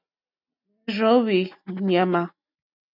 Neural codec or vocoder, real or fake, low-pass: none; real; 5.4 kHz